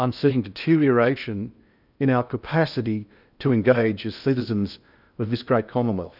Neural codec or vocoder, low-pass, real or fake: codec, 16 kHz in and 24 kHz out, 0.6 kbps, FocalCodec, streaming, 2048 codes; 5.4 kHz; fake